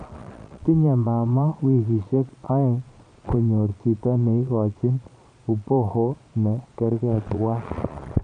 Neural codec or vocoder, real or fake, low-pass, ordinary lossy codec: vocoder, 22.05 kHz, 80 mel bands, Vocos; fake; 9.9 kHz; none